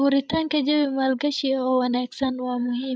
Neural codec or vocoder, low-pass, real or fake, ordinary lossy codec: codec, 16 kHz, 16 kbps, FreqCodec, larger model; none; fake; none